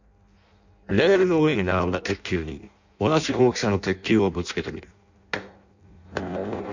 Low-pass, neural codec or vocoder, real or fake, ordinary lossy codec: 7.2 kHz; codec, 16 kHz in and 24 kHz out, 0.6 kbps, FireRedTTS-2 codec; fake; none